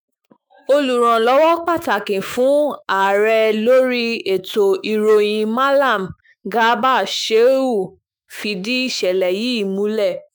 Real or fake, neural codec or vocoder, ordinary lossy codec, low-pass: fake; autoencoder, 48 kHz, 128 numbers a frame, DAC-VAE, trained on Japanese speech; none; none